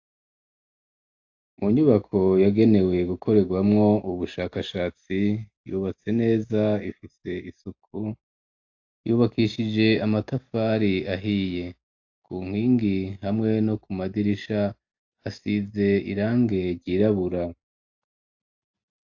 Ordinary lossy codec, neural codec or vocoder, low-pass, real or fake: AAC, 48 kbps; none; 7.2 kHz; real